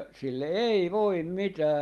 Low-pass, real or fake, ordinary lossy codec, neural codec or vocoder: 19.8 kHz; real; Opus, 32 kbps; none